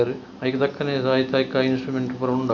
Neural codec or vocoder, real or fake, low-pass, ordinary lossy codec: none; real; 7.2 kHz; none